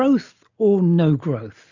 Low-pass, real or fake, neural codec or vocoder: 7.2 kHz; real; none